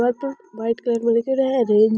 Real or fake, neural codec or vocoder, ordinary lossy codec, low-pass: real; none; none; none